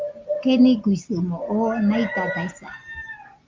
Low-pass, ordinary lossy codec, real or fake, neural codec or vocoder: 7.2 kHz; Opus, 24 kbps; real; none